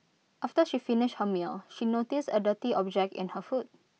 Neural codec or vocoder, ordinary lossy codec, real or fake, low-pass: none; none; real; none